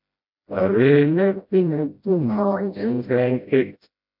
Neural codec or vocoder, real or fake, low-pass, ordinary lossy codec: codec, 16 kHz, 0.5 kbps, FreqCodec, smaller model; fake; 5.4 kHz; MP3, 48 kbps